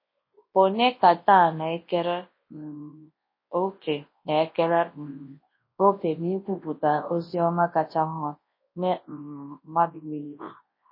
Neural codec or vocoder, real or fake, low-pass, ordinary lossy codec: codec, 24 kHz, 0.9 kbps, WavTokenizer, large speech release; fake; 5.4 kHz; MP3, 24 kbps